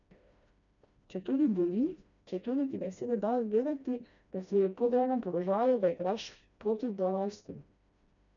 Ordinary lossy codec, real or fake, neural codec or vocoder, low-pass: none; fake; codec, 16 kHz, 1 kbps, FreqCodec, smaller model; 7.2 kHz